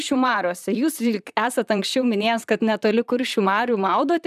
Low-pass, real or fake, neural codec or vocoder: 14.4 kHz; fake; vocoder, 44.1 kHz, 128 mel bands, Pupu-Vocoder